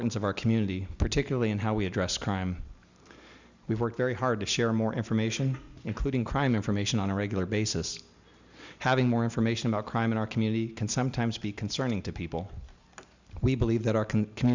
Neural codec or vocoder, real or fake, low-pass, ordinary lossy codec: none; real; 7.2 kHz; Opus, 64 kbps